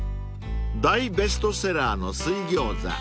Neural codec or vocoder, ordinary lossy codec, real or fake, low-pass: none; none; real; none